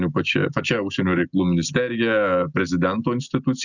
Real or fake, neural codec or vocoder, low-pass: real; none; 7.2 kHz